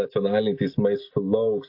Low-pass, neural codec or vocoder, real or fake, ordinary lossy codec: 5.4 kHz; none; real; AAC, 32 kbps